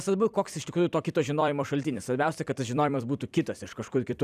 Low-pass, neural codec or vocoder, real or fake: 14.4 kHz; vocoder, 44.1 kHz, 128 mel bands every 256 samples, BigVGAN v2; fake